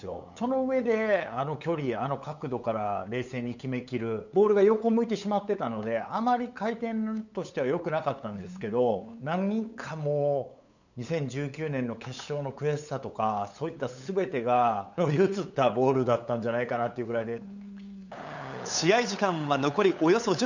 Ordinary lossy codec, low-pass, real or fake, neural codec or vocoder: none; 7.2 kHz; fake; codec, 16 kHz, 8 kbps, FunCodec, trained on LibriTTS, 25 frames a second